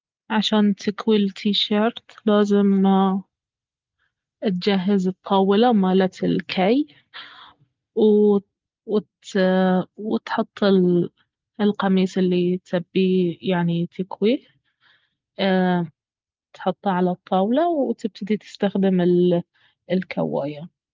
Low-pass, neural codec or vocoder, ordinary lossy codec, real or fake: 7.2 kHz; none; Opus, 32 kbps; real